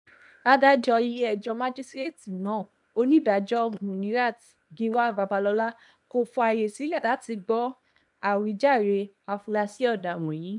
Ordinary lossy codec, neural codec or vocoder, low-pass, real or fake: none; codec, 24 kHz, 0.9 kbps, WavTokenizer, small release; 10.8 kHz; fake